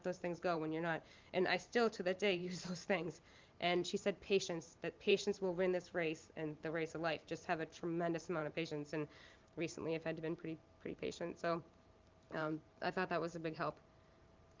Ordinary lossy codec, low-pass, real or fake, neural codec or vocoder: Opus, 32 kbps; 7.2 kHz; fake; vocoder, 44.1 kHz, 128 mel bands every 512 samples, BigVGAN v2